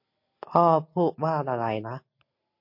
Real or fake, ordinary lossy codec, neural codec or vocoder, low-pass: real; MP3, 32 kbps; none; 5.4 kHz